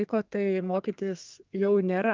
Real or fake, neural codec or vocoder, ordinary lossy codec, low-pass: fake; codec, 32 kHz, 1.9 kbps, SNAC; Opus, 24 kbps; 7.2 kHz